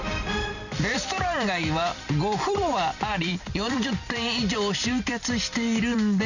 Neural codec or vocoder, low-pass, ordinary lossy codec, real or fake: vocoder, 44.1 kHz, 128 mel bands every 256 samples, BigVGAN v2; 7.2 kHz; none; fake